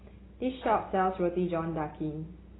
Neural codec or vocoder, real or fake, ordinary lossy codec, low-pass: none; real; AAC, 16 kbps; 7.2 kHz